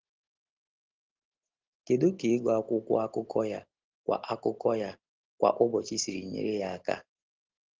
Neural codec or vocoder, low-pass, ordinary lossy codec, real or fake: none; 7.2 kHz; Opus, 16 kbps; real